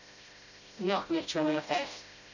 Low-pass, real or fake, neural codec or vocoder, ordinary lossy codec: 7.2 kHz; fake; codec, 16 kHz, 0.5 kbps, FreqCodec, smaller model; none